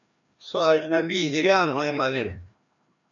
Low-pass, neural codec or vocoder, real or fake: 7.2 kHz; codec, 16 kHz, 1 kbps, FreqCodec, larger model; fake